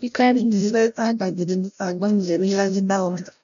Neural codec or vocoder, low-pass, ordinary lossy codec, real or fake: codec, 16 kHz, 0.5 kbps, FreqCodec, larger model; 7.2 kHz; none; fake